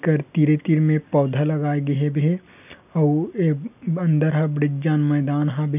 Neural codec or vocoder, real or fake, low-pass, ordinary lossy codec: none; real; 3.6 kHz; none